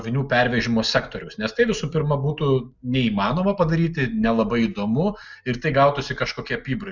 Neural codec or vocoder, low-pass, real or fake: none; 7.2 kHz; real